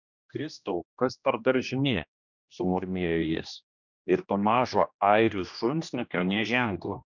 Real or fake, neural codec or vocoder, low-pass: fake; codec, 16 kHz, 1 kbps, X-Codec, HuBERT features, trained on general audio; 7.2 kHz